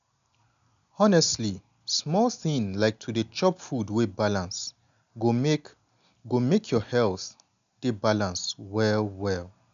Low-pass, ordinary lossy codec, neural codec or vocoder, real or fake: 7.2 kHz; none; none; real